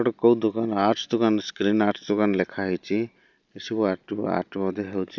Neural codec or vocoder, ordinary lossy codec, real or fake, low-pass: none; none; real; 7.2 kHz